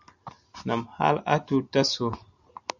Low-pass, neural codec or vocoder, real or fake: 7.2 kHz; none; real